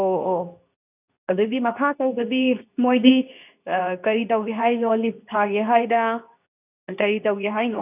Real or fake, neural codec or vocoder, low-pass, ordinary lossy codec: fake; codec, 24 kHz, 0.9 kbps, WavTokenizer, medium speech release version 2; 3.6 kHz; none